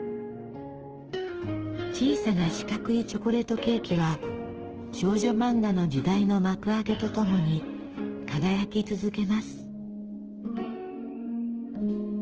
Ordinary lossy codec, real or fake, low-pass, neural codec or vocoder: Opus, 16 kbps; fake; 7.2 kHz; autoencoder, 48 kHz, 32 numbers a frame, DAC-VAE, trained on Japanese speech